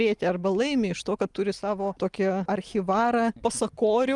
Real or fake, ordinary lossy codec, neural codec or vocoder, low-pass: real; Opus, 32 kbps; none; 10.8 kHz